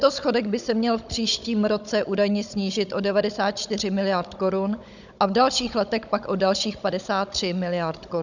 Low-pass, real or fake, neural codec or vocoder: 7.2 kHz; fake; codec, 16 kHz, 16 kbps, FunCodec, trained on Chinese and English, 50 frames a second